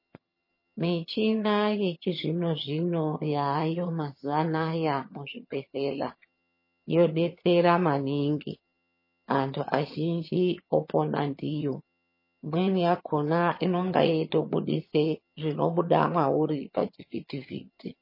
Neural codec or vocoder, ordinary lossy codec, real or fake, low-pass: vocoder, 22.05 kHz, 80 mel bands, HiFi-GAN; MP3, 24 kbps; fake; 5.4 kHz